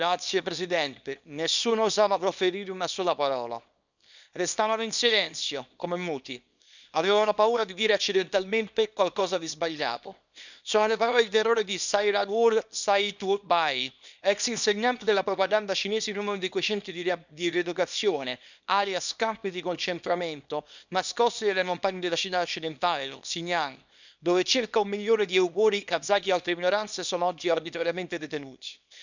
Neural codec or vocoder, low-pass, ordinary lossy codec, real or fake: codec, 24 kHz, 0.9 kbps, WavTokenizer, small release; 7.2 kHz; none; fake